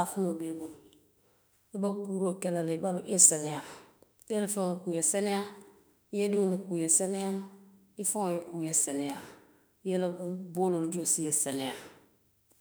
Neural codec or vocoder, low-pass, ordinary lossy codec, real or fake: autoencoder, 48 kHz, 32 numbers a frame, DAC-VAE, trained on Japanese speech; none; none; fake